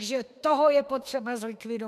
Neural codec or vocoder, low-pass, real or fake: autoencoder, 48 kHz, 32 numbers a frame, DAC-VAE, trained on Japanese speech; 14.4 kHz; fake